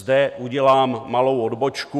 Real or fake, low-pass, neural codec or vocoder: real; 14.4 kHz; none